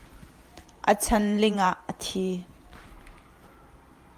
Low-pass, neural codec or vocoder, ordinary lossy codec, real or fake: 14.4 kHz; vocoder, 44.1 kHz, 128 mel bands every 512 samples, BigVGAN v2; Opus, 32 kbps; fake